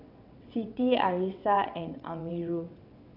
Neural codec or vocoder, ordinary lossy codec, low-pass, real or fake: vocoder, 22.05 kHz, 80 mel bands, WaveNeXt; none; 5.4 kHz; fake